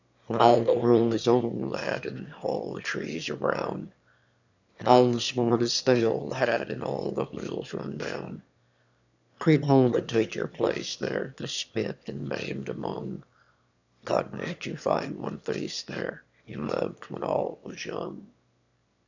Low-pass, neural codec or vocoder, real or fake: 7.2 kHz; autoencoder, 22.05 kHz, a latent of 192 numbers a frame, VITS, trained on one speaker; fake